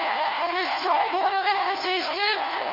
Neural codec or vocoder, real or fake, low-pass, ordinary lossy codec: codec, 16 kHz, 1 kbps, FunCodec, trained on LibriTTS, 50 frames a second; fake; 5.4 kHz; MP3, 32 kbps